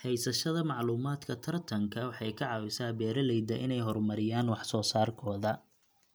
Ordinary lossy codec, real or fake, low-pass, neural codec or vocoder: none; real; none; none